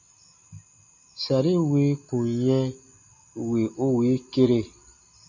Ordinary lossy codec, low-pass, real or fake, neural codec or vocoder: AAC, 48 kbps; 7.2 kHz; real; none